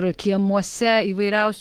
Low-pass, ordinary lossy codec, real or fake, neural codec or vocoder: 19.8 kHz; Opus, 16 kbps; fake; autoencoder, 48 kHz, 32 numbers a frame, DAC-VAE, trained on Japanese speech